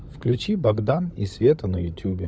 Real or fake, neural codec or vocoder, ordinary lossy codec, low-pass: fake; codec, 16 kHz, 16 kbps, FunCodec, trained on LibriTTS, 50 frames a second; none; none